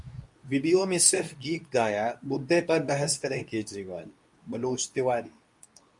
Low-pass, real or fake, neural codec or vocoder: 10.8 kHz; fake; codec, 24 kHz, 0.9 kbps, WavTokenizer, medium speech release version 2